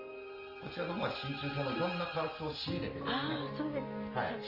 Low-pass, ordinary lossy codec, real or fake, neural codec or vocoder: 5.4 kHz; Opus, 32 kbps; real; none